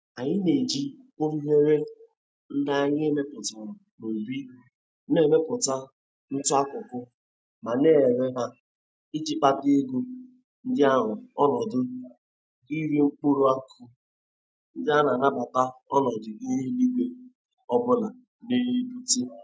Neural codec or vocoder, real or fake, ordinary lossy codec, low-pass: none; real; none; none